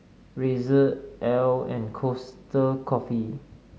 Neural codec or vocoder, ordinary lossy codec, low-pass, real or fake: none; none; none; real